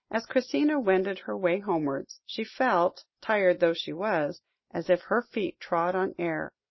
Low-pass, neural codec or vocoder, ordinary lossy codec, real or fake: 7.2 kHz; none; MP3, 24 kbps; real